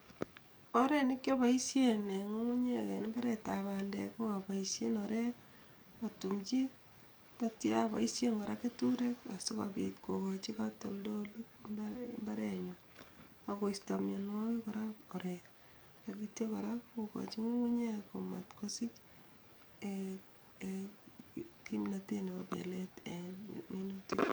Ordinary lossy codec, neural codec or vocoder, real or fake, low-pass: none; codec, 44.1 kHz, 7.8 kbps, DAC; fake; none